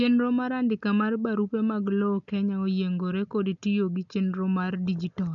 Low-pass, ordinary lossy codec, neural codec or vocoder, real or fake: 7.2 kHz; none; none; real